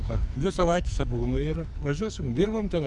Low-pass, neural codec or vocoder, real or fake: 10.8 kHz; codec, 44.1 kHz, 2.6 kbps, SNAC; fake